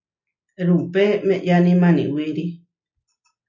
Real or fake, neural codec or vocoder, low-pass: real; none; 7.2 kHz